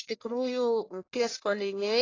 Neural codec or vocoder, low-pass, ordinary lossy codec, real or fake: codec, 44.1 kHz, 1.7 kbps, Pupu-Codec; 7.2 kHz; AAC, 32 kbps; fake